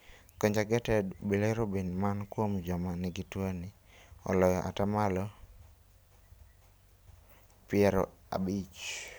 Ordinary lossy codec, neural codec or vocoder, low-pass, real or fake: none; none; none; real